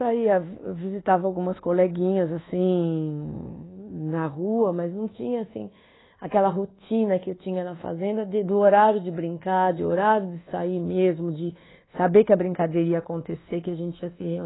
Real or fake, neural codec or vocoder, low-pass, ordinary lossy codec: fake; codec, 24 kHz, 1.2 kbps, DualCodec; 7.2 kHz; AAC, 16 kbps